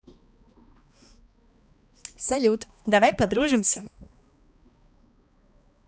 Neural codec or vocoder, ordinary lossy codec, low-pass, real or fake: codec, 16 kHz, 2 kbps, X-Codec, HuBERT features, trained on balanced general audio; none; none; fake